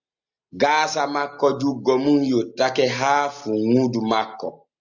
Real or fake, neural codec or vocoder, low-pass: real; none; 7.2 kHz